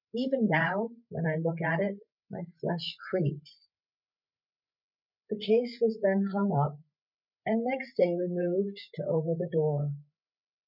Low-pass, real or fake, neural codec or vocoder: 5.4 kHz; fake; codec, 16 kHz, 8 kbps, FreqCodec, larger model